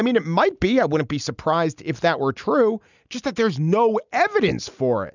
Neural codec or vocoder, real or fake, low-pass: none; real; 7.2 kHz